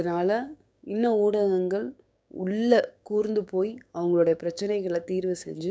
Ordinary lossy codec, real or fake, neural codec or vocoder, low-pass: none; fake; codec, 16 kHz, 6 kbps, DAC; none